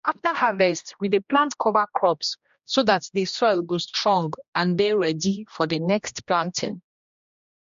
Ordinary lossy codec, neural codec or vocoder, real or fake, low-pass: MP3, 48 kbps; codec, 16 kHz, 1 kbps, X-Codec, HuBERT features, trained on general audio; fake; 7.2 kHz